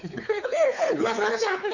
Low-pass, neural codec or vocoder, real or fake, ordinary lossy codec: 7.2 kHz; codec, 16 kHz, 4 kbps, X-Codec, WavLM features, trained on Multilingual LibriSpeech; fake; none